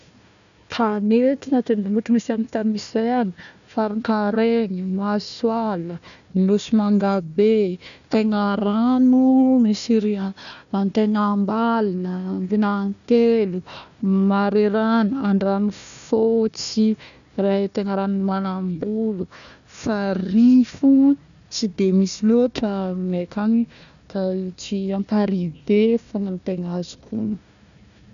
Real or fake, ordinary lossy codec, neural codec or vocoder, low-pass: fake; none; codec, 16 kHz, 1 kbps, FunCodec, trained on Chinese and English, 50 frames a second; 7.2 kHz